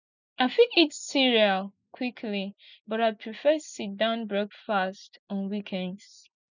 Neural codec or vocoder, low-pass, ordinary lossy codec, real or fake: none; 7.2 kHz; none; real